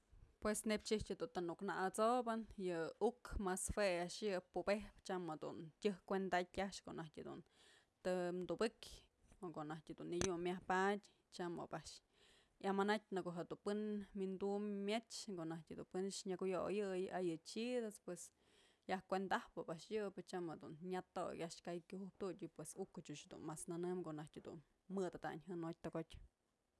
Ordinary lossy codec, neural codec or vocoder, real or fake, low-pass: none; none; real; none